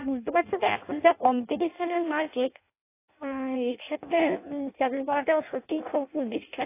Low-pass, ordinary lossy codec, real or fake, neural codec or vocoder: 3.6 kHz; AAC, 24 kbps; fake; codec, 16 kHz in and 24 kHz out, 0.6 kbps, FireRedTTS-2 codec